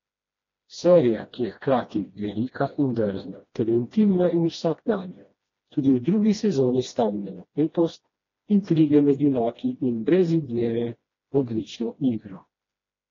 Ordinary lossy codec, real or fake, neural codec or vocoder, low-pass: AAC, 32 kbps; fake; codec, 16 kHz, 1 kbps, FreqCodec, smaller model; 7.2 kHz